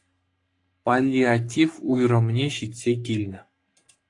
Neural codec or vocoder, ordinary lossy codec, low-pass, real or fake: codec, 44.1 kHz, 3.4 kbps, Pupu-Codec; AAC, 48 kbps; 10.8 kHz; fake